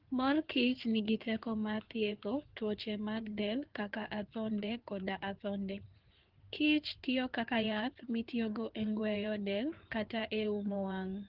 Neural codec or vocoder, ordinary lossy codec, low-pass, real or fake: codec, 16 kHz in and 24 kHz out, 2.2 kbps, FireRedTTS-2 codec; Opus, 16 kbps; 5.4 kHz; fake